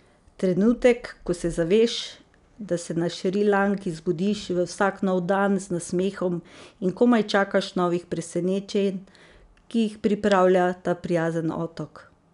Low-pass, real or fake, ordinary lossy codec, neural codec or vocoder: 10.8 kHz; real; none; none